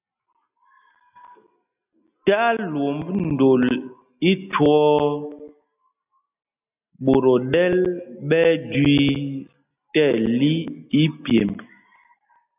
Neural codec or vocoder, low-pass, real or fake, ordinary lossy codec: none; 3.6 kHz; real; AAC, 32 kbps